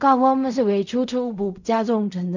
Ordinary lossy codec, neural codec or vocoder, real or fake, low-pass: none; codec, 16 kHz in and 24 kHz out, 0.4 kbps, LongCat-Audio-Codec, fine tuned four codebook decoder; fake; 7.2 kHz